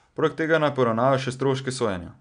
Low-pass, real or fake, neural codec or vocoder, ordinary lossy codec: 9.9 kHz; real; none; none